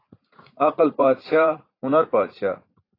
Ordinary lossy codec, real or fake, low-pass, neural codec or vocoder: AAC, 24 kbps; fake; 5.4 kHz; vocoder, 44.1 kHz, 80 mel bands, Vocos